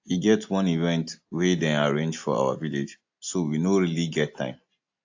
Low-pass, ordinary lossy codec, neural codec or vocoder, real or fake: 7.2 kHz; AAC, 48 kbps; none; real